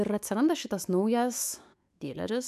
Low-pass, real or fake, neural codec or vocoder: 14.4 kHz; fake; autoencoder, 48 kHz, 128 numbers a frame, DAC-VAE, trained on Japanese speech